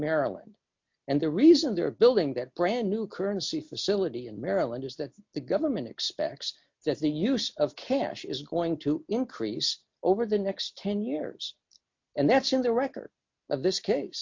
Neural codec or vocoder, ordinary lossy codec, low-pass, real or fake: none; MP3, 48 kbps; 7.2 kHz; real